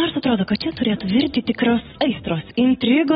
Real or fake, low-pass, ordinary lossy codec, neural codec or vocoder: real; 19.8 kHz; AAC, 16 kbps; none